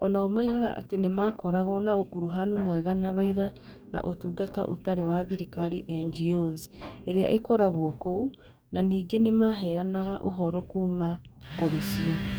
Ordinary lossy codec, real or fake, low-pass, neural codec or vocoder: none; fake; none; codec, 44.1 kHz, 2.6 kbps, DAC